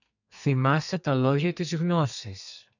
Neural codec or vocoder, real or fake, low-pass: codec, 32 kHz, 1.9 kbps, SNAC; fake; 7.2 kHz